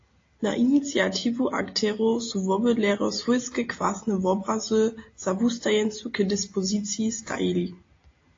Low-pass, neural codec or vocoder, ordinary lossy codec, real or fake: 7.2 kHz; none; AAC, 32 kbps; real